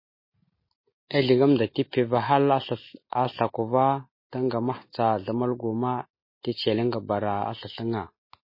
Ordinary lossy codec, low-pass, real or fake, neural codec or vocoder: MP3, 24 kbps; 5.4 kHz; real; none